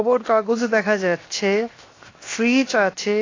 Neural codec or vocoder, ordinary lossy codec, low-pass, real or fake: codec, 16 kHz, 0.7 kbps, FocalCodec; AAC, 32 kbps; 7.2 kHz; fake